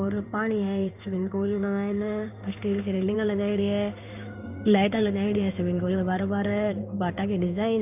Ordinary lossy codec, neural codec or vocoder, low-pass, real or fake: none; codec, 16 kHz in and 24 kHz out, 1 kbps, XY-Tokenizer; 3.6 kHz; fake